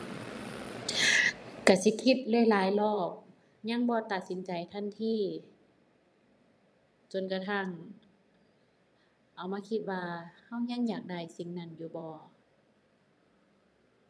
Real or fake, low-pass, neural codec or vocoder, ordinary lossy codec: fake; none; vocoder, 22.05 kHz, 80 mel bands, Vocos; none